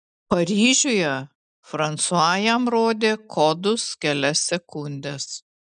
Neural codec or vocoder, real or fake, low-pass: none; real; 9.9 kHz